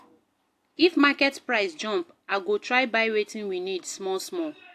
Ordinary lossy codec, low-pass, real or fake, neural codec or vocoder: AAC, 64 kbps; 14.4 kHz; real; none